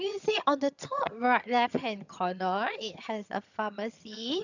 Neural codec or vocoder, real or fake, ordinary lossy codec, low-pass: vocoder, 22.05 kHz, 80 mel bands, HiFi-GAN; fake; none; 7.2 kHz